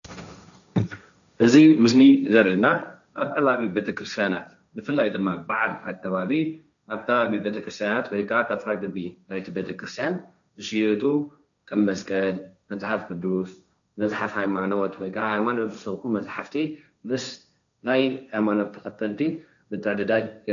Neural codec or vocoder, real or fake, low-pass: codec, 16 kHz, 1.1 kbps, Voila-Tokenizer; fake; 7.2 kHz